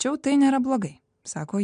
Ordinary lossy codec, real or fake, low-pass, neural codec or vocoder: MP3, 64 kbps; real; 9.9 kHz; none